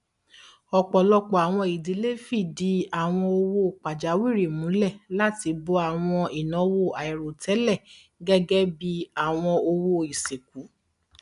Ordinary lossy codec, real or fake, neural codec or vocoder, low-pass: none; real; none; 10.8 kHz